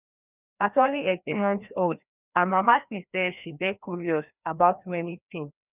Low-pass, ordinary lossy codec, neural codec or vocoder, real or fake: 3.6 kHz; none; codec, 16 kHz, 1 kbps, FreqCodec, larger model; fake